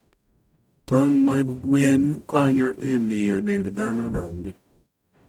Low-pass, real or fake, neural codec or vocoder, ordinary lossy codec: 19.8 kHz; fake; codec, 44.1 kHz, 0.9 kbps, DAC; none